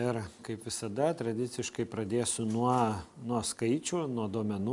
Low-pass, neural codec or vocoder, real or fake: 10.8 kHz; none; real